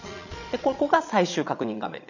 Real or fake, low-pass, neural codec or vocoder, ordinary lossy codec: fake; 7.2 kHz; vocoder, 22.05 kHz, 80 mel bands, Vocos; none